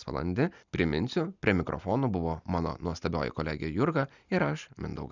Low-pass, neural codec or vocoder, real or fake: 7.2 kHz; none; real